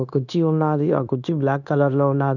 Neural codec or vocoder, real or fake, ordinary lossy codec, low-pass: codec, 16 kHz, 0.9 kbps, LongCat-Audio-Codec; fake; none; 7.2 kHz